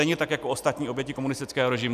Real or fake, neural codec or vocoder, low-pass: real; none; 14.4 kHz